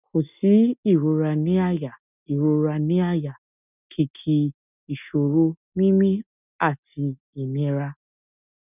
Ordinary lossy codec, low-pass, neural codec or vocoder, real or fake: none; 3.6 kHz; none; real